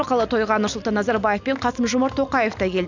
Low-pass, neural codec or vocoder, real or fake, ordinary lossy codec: 7.2 kHz; none; real; none